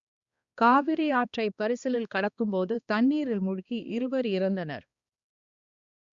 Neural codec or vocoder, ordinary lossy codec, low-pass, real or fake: codec, 16 kHz, 2 kbps, X-Codec, HuBERT features, trained on balanced general audio; Opus, 64 kbps; 7.2 kHz; fake